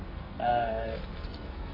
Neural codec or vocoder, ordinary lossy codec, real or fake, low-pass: codec, 44.1 kHz, 2.6 kbps, SNAC; MP3, 24 kbps; fake; 5.4 kHz